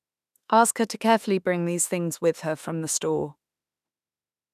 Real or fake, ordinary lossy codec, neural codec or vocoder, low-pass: fake; none; autoencoder, 48 kHz, 32 numbers a frame, DAC-VAE, trained on Japanese speech; 14.4 kHz